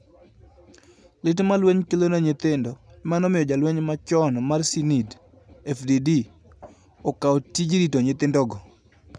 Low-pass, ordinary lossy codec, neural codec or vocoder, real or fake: none; none; none; real